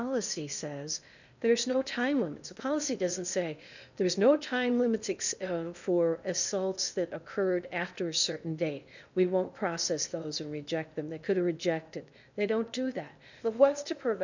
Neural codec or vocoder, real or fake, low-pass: codec, 16 kHz in and 24 kHz out, 0.8 kbps, FocalCodec, streaming, 65536 codes; fake; 7.2 kHz